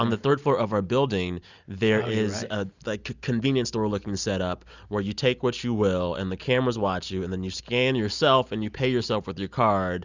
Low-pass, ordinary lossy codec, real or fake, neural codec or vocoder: 7.2 kHz; Opus, 64 kbps; real; none